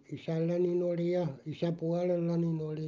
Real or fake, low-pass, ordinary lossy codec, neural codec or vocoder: real; 7.2 kHz; Opus, 24 kbps; none